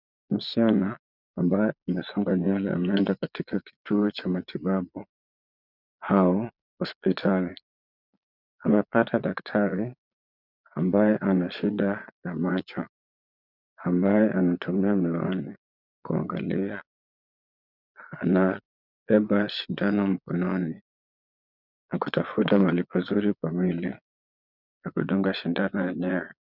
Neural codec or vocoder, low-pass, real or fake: vocoder, 22.05 kHz, 80 mel bands, Vocos; 5.4 kHz; fake